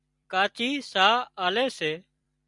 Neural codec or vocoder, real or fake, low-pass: vocoder, 44.1 kHz, 128 mel bands every 256 samples, BigVGAN v2; fake; 10.8 kHz